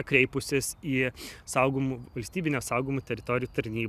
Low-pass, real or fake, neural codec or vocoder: 14.4 kHz; real; none